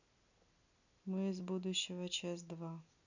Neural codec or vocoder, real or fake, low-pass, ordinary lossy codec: none; real; 7.2 kHz; none